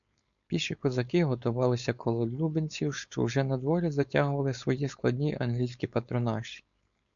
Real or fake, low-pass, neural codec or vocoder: fake; 7.2 kHz; codec, 16 kHz, 4.8 kbps, FACodec